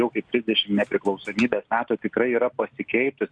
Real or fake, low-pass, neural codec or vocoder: real; 9.9 kHz; none